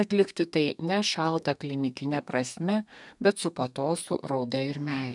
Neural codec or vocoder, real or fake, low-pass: codec, 32 kHz, 1.9 kbps, SNAC; fake; 10.8 kHz